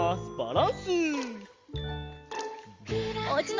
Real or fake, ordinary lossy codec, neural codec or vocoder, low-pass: real; Opus, 32 kbps; none; 7.2 kHz